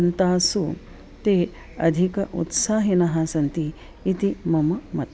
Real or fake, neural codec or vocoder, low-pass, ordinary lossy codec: real; none; none; none